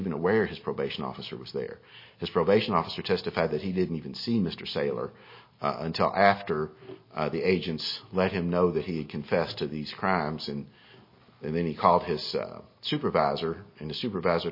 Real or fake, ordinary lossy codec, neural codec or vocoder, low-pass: real; MP3, 24 kbps; none; 5.4 kHz